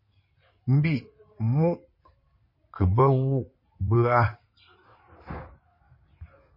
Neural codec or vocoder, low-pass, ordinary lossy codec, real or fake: codec, 16 kHz in and 24 kHz out, 2.2 kbps, FireRedTTS-2 codec; 5.4 kHz; MP3, 24 kbps; fake